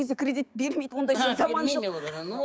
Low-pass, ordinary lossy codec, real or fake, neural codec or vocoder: none; none; fake; codec, 16 kHz, 6 kbps, DAC